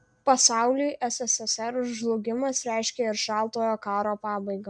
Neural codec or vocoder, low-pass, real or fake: none; 9.9 kHz; real